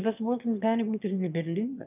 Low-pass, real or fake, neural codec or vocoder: 3.6 kHz; fake; autoencoder, 22.05 kHz, a latent of 192 numbers a frame, VITS, trained on one speaker